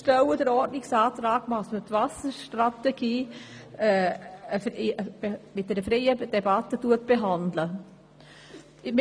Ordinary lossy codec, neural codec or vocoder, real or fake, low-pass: none; none; real; none